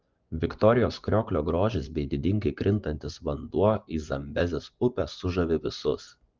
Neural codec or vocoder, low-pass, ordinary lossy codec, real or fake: vocoder, 24 kHz, 100 mel bands, Vocos; 7.2 kHz; Opus, 32 kbps; fake